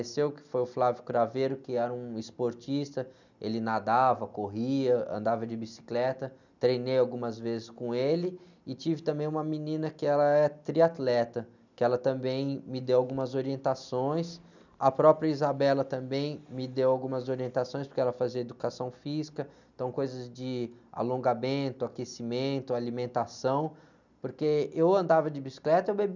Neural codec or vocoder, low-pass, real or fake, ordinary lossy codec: none; 7.2 kHz; real; none